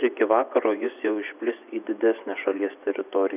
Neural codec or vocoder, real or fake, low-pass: vocoder, 24 kHz, 100 mel bands, Vocos; fake; 3.6 kHz